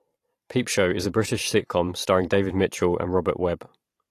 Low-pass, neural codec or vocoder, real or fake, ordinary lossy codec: 14.4 kHz; vocoder, 44.1 kHz, 128 mel bands every 512 samples, BigVGAN v2; fake; AAC, 48 kbps